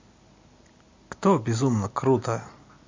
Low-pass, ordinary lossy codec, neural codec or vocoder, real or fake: 7.2 kHz; MP3, 48 kbps; none; real